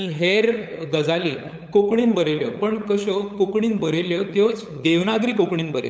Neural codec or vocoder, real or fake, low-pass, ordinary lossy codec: codec, 16 kHz, 8 kbps, FunCodec, trained on LibriTTS, 25 frames a second; fake; none; none